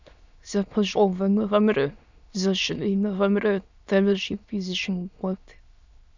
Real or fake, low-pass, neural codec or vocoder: fake; 7.2 kHz; autoencoder, 22.05 kHz, a latent of 192 numbers a frame, VITS, trained on many speakers